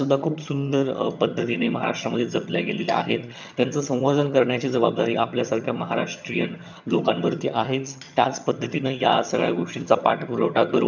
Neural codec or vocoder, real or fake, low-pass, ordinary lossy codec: vocoder, 22.05 kHz, 80 mel bands, HiFi-GAN; fake; 7.2 kHz; none